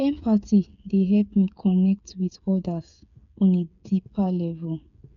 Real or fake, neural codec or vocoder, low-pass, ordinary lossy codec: fake; codec, 16 kHz, 8 kbps, FreqCodec, smaller model; 7.2 kHz; none